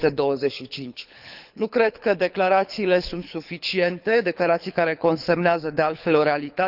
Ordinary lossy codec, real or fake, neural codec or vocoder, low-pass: none; fake; codec, 24 kHz, 6 kbps, HILCodec; 5.4 kHz